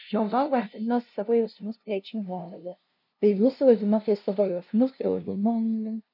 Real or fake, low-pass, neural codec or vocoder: fake; 5.4 kHz; codec, 16 kHz, 0.5 kbps, FunCodec, trained on LibriTTS, 25 frames a second